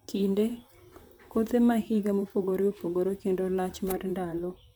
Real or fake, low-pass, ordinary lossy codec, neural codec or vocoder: fake; none; none; vocoder, 44.1 kHz, 128 mel bands, Pupu-Vocoder